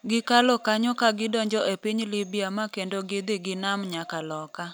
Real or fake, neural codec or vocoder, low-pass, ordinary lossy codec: real; none; none; none